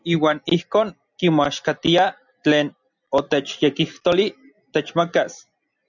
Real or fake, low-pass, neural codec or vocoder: real; 7.2 kHz; none